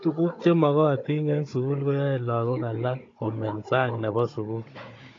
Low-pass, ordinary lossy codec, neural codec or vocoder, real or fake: 7.2 kHz; AAC, 32 kbps; codec, 16 kHz, 16 kbps, FunCodec, trained on Chinese and English, 50 frames a second; fake